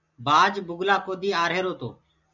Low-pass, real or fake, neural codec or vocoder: 7.2 kHz; real; none